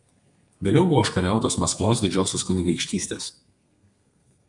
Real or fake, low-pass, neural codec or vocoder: fake; 10.8 kHz; codec, 32 kHz, 1.9 kbps, SNAC